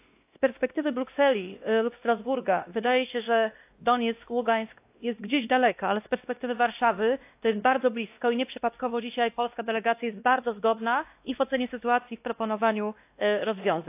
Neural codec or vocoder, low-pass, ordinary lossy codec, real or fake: codec, 16 kHz, 1 kbps, X-Codec, WavLM features, trained on Multilingual LibriSpeech; 3.6 kHz; AAC, 32 kbps; fake